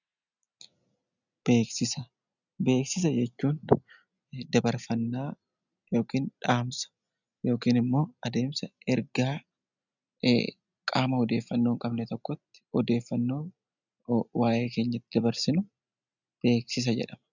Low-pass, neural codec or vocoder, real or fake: 7.2 kHz; none; real